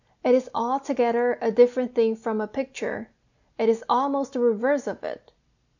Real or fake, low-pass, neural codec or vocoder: real; 7.2 kHz; none